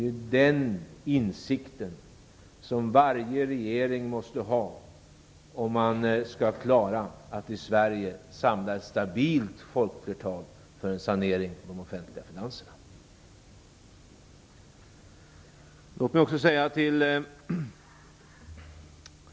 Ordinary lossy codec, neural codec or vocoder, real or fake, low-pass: none; none; real; none